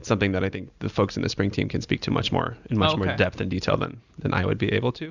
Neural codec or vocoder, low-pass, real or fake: none; 7.2 kHz; real